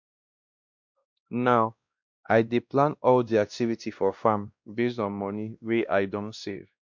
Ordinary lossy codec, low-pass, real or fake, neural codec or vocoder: MP3, 64 kbps; 7.2 kHz; fake; codec, 16 kHz, 1 kbps, X-Codec, WavLM features, trained on Multilingual LibriSpeech